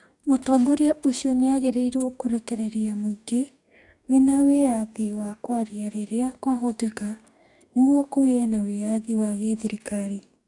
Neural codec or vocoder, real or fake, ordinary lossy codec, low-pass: codec, 44.1 kHz, 2.6 kbps, DAC; fake; none; 10.8 kHz